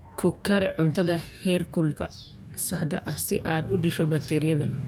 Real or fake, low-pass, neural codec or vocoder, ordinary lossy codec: fake; none; codec, 44.1 kHz, 2.6 kbps, DAC; none